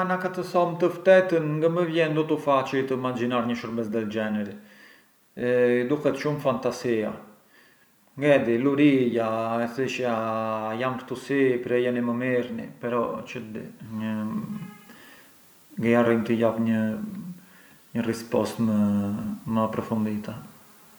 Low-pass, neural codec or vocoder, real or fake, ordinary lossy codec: none; none; real; none